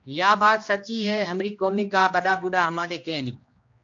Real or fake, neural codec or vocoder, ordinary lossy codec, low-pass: fake; codec, 16 kHz, 1 kbps, X-Codec, HuBERT features, trained on general audio; AAC, 48 kbps; 7.2 kHz